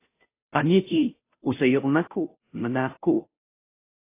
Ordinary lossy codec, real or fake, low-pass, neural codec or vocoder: AAC, 24 kbps; fake; 3.6 kHz; codec, 16 kHz, 0.5 kbps, FunCodec, trained on Chinese and English, 25 frames a second